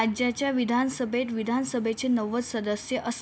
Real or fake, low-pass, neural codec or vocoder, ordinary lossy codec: real; none; none; none